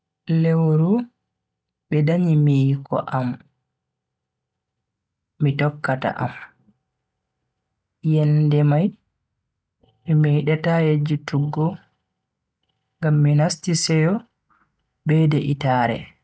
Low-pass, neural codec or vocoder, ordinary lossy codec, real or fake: none; none; none; real